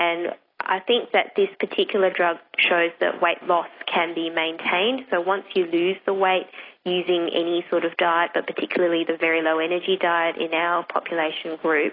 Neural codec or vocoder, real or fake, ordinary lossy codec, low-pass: none; real; AAC, 24 kbps; 5.4 kHz